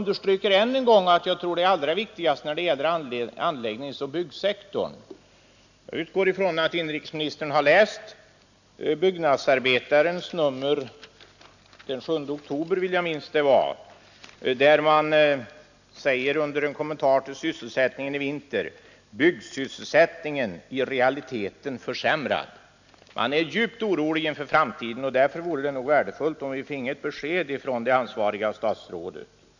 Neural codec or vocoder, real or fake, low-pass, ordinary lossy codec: none; real; 7.2 kHz; none